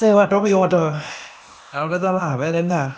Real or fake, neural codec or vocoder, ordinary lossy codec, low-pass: fake; codec, 16 kHz, 0.8 kbps, ZipCodec; none; none